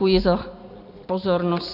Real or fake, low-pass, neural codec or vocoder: real; 5.4 kHz; none